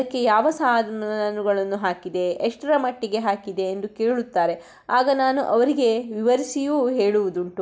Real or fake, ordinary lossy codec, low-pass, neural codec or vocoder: real; none; none; none